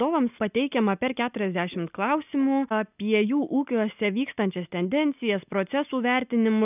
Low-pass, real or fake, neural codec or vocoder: 3.6 kHz; real; none